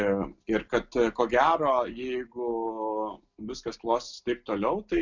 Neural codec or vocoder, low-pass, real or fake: none; 7.2 kHz; real